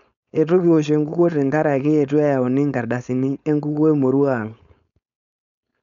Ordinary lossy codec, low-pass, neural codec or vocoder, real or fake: none; 7.2 kHz; codec, 16 kHz, 4.8 kbps, FACodec; fake